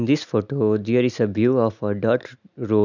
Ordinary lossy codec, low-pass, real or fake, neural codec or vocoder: none; 7.2 kHz; real; none